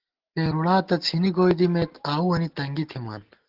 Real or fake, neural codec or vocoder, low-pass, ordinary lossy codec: real; none; 5.4 kHz; Opus, 16 kbps